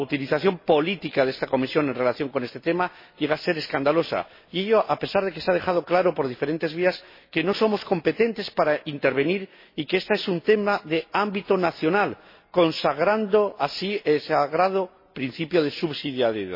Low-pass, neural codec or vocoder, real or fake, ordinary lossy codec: 5.4 kHz; none; real; MP3, 24 kbps